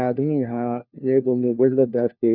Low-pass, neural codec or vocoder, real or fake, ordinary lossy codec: 5.4 kHz; codec, 16 kHz, 1 kbps, FunCodec, trained on LibriTTS, 50 frames a second; fake; none